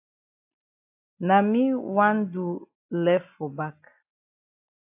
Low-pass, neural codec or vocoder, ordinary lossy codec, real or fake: 3.6 kHz; none; AAC, 32 kbps; real